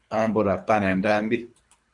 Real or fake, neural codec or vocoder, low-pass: fake; codec, 24 kHz, 3 kbps, HILCodec; 10.8 kHz